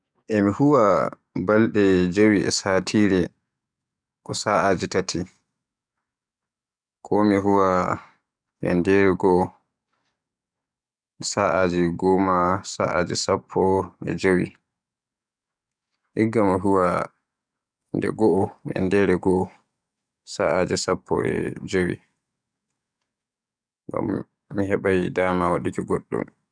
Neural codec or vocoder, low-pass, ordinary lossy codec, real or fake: codec, 44.1 kHz, 7.8 kbps, DAC; 9.9 kHz; none; fake